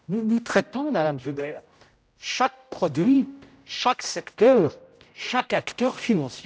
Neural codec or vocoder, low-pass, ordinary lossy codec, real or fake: codec, 16 kHz, 0.5 kbps, X-Codec, HuBERT features, trained on general audio; none; none; fake